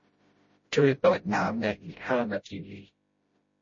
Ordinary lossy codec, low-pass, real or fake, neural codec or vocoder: MP3, 32 kbps; 7.2 kHz; fake; codec, 16 kHz, 0.5 kbps, FreqCodec, smaller model